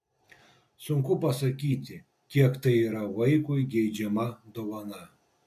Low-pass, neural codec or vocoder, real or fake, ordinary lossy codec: 14.4 kHz; none; real; MP3, 96 kbps